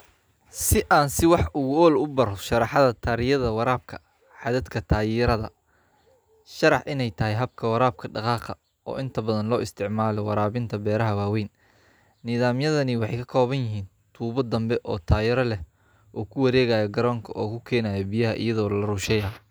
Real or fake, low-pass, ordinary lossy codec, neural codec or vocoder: real; none; none; none